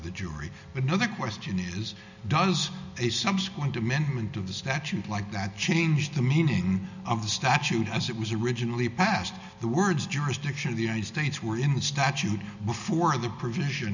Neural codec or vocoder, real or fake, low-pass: none; real; 7.2 kHz